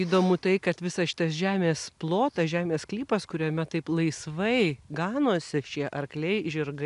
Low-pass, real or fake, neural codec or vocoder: 10.8 kHz; real; none